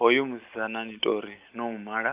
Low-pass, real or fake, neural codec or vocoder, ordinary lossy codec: 3.6 kHz; real; none; Opus, 32 kbps